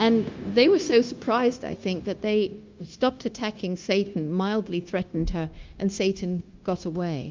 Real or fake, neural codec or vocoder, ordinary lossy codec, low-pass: fake; codec, 16 kHz, 0.9 kbps, LongCat-Audio-Codec; Opus, 24 kbps; 7.2 kHz